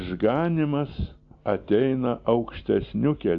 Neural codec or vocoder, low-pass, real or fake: none; 7.2 kHz; real